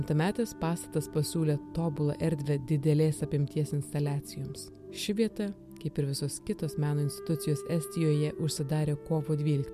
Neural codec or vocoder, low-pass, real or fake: none; 14.4 kHz; real